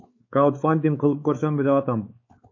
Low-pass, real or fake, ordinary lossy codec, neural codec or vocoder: 7.2 kHz; fake; MP3, 32 kbps; codec, 16 kHz, 4 kbps, X-Codec, WavLM features, trained on Multilingual LibriSpeech